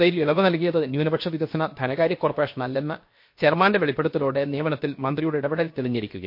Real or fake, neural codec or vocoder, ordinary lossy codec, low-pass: fake; codec, 16 kHz, 0.7 kbps, FocalCodec; MP3, 32 kbps; 5.4 kHz